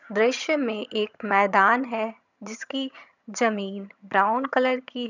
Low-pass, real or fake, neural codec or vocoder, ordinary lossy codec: 7.2 kHz; fake; vocoder, 22.05 kHz, 80 mel bands, HiFi-GAN; none